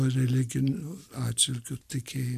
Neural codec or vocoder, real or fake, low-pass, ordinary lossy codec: none; real; 14.4 kHz; MP3, 96 kbps